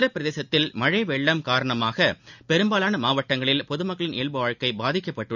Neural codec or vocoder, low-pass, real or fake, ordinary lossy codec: none; 7.2 kHz; real; none